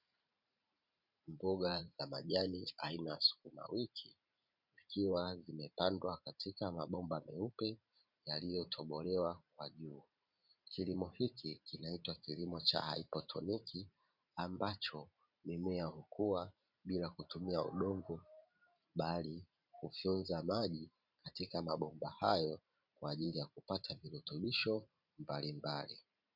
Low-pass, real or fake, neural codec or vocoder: 5.4 kHz; fake; vocoder, 44.1 kHz, 128 mel bands every 512 samples, BigVGAN v2